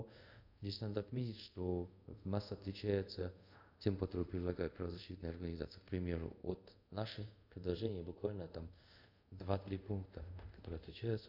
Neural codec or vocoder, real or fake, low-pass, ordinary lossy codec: codec, 24 kHz, 0.5 kbps, DualCodec; fake; 5.4 kHz; none